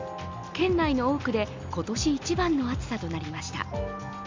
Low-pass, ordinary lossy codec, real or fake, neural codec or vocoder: 7.2 kHz; MP3, 64 kbps; real; none